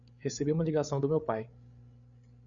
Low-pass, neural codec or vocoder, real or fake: 7.2 kHz; codec, 16 kHz, 8 kbps, FreqCodec, larger model; fake